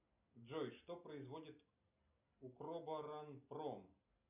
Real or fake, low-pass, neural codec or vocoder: real; 3.6 kHz; none